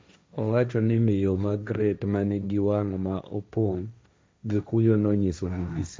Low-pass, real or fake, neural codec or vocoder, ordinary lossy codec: none; fake; codec, 16 kHz, 1.1 kbps, Voila-Tokenizer; none